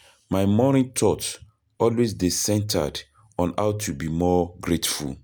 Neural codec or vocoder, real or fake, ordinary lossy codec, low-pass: none; real; none; none